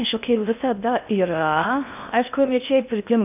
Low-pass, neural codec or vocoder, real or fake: 3.6 kHz; codec, 16 kHz in and 24 kHz out, 0.6 kbps, FocalCodec, streaming, 4096 codes; fake